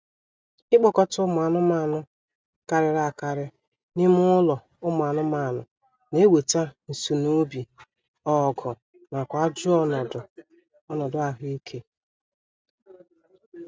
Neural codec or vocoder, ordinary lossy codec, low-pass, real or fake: none; none; none; real